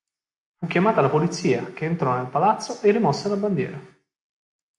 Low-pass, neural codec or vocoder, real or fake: 10.8 kHz; none; real